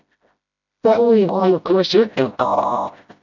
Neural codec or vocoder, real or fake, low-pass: codec, 16 kHz, 0.5 kbps, FreqCodec, smaller model; fake; 7.2 kHz